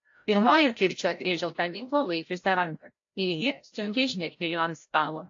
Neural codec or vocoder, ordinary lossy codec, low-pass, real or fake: codec, 16 kHz, 0.5 kbps, FreqCodec, larger model; AAC, 48 kbps; 7.2 kHz; fake